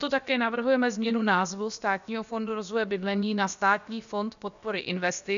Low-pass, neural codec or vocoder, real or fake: 7.2 kHz; codec, 16 kHz, about 1 kbps, DyCAST, with the encoder's durations; fake